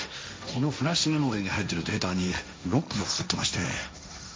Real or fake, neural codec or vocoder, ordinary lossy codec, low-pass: fake; codec, 16 kHz, 1.1 kbps, Voila-Tokenizer; none; none